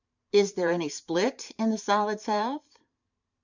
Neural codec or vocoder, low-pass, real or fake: vocoder, 44.1 kHz, 128 mel bands every 512 samples, BigVGAN v2; 7.2 kHz; fake